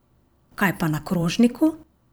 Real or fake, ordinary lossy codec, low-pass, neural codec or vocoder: fake; none; none; vocoder, 44.1 kHz, 128 mel bands every 256 samples, BigVGAN v2